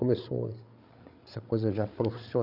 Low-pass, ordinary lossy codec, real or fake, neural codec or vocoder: 5.4 kHz; none; fake; codec, 16 kHz, 8 kbps, FreqCodec, larger model